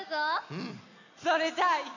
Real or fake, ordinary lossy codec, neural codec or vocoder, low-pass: real; none; none; 7.2 kHz